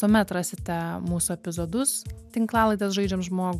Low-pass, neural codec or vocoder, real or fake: 14.4 kHz; none; real